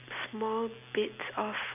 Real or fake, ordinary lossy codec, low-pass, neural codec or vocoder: real; none; 3.6 kHz; none